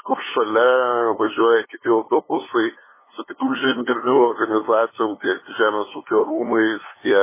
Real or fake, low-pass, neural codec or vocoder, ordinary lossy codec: fake; 3.6 kHz; codec, 16 kHz, 2 kbps, FunCodec, trained on LibriTTS, 25 frames a second; MP3, 16 kbps